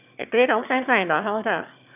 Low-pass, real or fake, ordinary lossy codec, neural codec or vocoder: 3.6 kHz; fake; none; autoencoder, 22.05 kHz, a latent of 192 numbers a frame, VITS, trained on one speaker